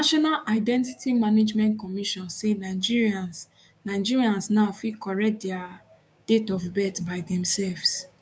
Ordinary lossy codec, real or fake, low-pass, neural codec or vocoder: none; fake; none; codec, 16 kHz, 6 kbps, DAC